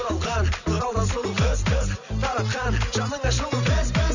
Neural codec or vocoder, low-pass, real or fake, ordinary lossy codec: none; 7.2 kHz; real; none